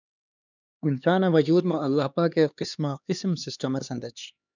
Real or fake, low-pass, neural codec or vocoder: fake; 7.2 kHz; codec, 16 kHz, 2 kbps, X-Codec, HuBERT features, trained on LibriSpeech